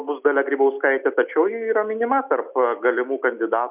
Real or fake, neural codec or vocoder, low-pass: real; none; 3.6 kHz